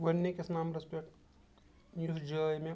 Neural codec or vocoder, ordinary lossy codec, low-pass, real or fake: none; none; none; real